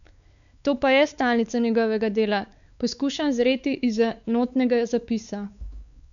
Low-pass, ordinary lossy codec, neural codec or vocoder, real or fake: 7.2 kHz; none; codec, 16 kHz, 4 kbps, X-Codec, WavLM features, trained on Multilingual LibriSpeech; fake